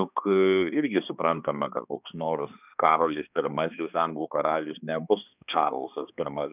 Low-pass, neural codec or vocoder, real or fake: 3.6 kHz; codec, 16 kHz, 2 kbps, X-Codec, HuBERT features, trained on balanced general audio; fake